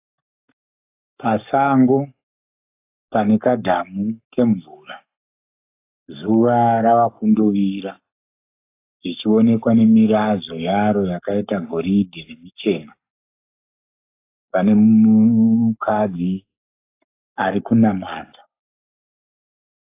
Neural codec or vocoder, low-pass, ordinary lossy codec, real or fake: codec, 44.1 kHz, 7.8 kbps, Pupu-Codec; 3.6 kHz; AAC, 24 kbps; fake